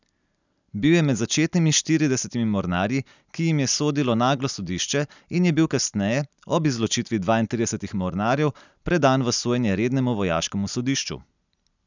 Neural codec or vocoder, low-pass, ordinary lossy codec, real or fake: none; 7.2 kHz; none; real